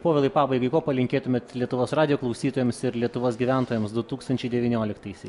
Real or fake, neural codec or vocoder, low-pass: real; none; 10.8 kHz